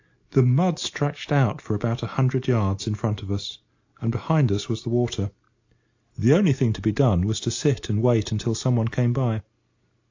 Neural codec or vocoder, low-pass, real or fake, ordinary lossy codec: none; 7.2 kHz; real; AAC, 48 kbps